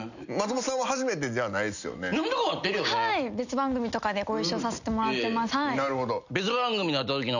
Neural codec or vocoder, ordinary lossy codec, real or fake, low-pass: none; none; real; 7.2 kHz